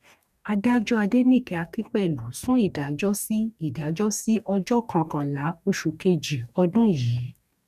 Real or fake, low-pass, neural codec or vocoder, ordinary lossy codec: fake; 14.4 kHz; codec, 44.1 kHz, 2.6 kbps, DAC; none